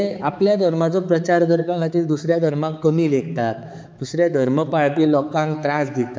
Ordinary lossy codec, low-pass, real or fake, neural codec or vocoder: none; none; fake; codec, 16 kHz, 4 kbps, X-Codec, HuBERT features, trained on balanced general audio